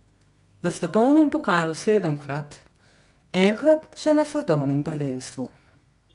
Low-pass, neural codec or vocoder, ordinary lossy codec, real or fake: 10.8 kHz; codec, 24 kHz, 0.9 kbps, WavTokenizer, medium music audio release; none; fake